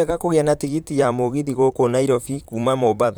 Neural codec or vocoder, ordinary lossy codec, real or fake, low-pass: vocoder, 44.1 kHz, 128 mel bands, Pupu-Vocoder; none; fake; none